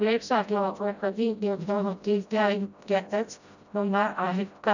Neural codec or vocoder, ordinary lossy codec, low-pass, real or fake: codec, 16 kHz, 0.5 kbps, FreqCodec, smaller model; none; 7.2 kHz; fake